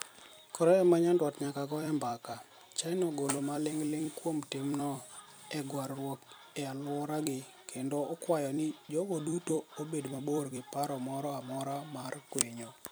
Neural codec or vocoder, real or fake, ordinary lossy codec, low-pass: vocoder, 44.1 kHz, 128 mel bands every 256 samples, BigVGAN v2; fake; none; none